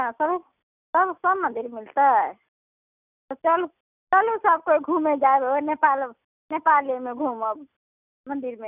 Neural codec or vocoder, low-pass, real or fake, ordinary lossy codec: none; 3.6 kHz; real; none